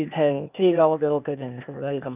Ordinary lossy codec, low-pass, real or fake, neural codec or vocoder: none; 3.6 kHz; fake; codec, 16 kHz, 0.8 kbps, ZipCodec